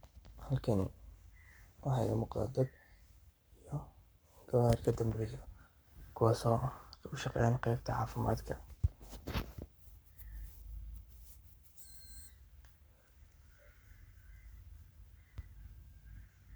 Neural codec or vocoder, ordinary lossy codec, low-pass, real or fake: codec, 44.1 kHz, 7.8 kbps, Pupu-Codec; none; none; fake